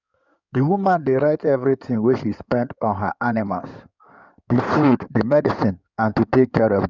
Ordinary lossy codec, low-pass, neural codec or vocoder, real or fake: none; 7.2 kHz; codec, 16 kHz in and 24 kHz out, 2.2 kbps, FireRedTTS-2 codec; fake